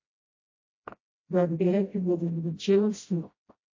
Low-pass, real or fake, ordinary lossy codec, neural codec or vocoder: 7.2 kHz; fake; MP3, 32 kbps; codec, 16 kHz, 0.5 kbps, FreqCodec, smaller model